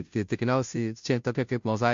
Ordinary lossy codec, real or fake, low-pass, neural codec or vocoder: MP3, 48 kbps; fake; 7.2 kHz; codec, 16 kHz, 0.5 kbps, FunCodec, trained on Chinese and English, 25 frames a second